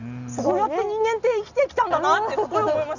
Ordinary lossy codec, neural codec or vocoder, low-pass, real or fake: none; none; 7.2 kHz; real